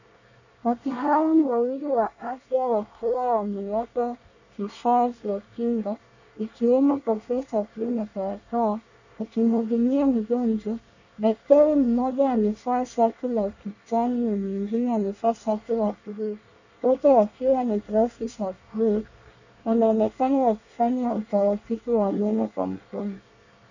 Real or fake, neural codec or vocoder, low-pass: fake; codec, 24 kHz, 1 kbps, SNAC; 7.2 kHz